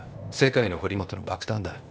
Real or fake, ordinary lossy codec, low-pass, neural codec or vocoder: fake; none; none; codec, 16 kHz, 0.8 kbps, ZipCodec